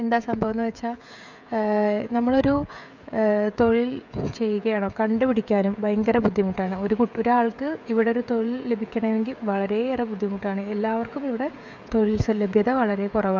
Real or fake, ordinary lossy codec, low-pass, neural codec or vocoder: fake; none; 7.2 kHz; codec, 16 kHz, 16 kbps, FreqCodec, smaller model